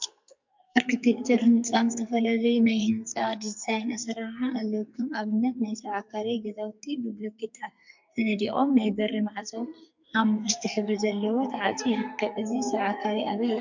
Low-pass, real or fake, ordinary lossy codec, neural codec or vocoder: 7.2 kHz; fake; MP3, 64 kbps; codec, 44.1 kHz, 2.6 kbps, SNAC